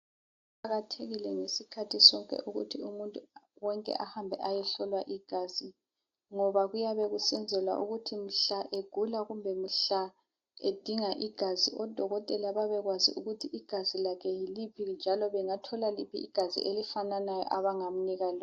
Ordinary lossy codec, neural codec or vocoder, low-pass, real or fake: AAC, 48 kbps; none; 7.2 kHz; real